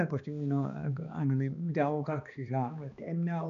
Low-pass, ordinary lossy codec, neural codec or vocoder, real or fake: 7.2 kHz; MP3, 96 kbps; codec, 16 kHz, 2 kbps, X-Codec, HuBERT features, trained on balanced general audio; fake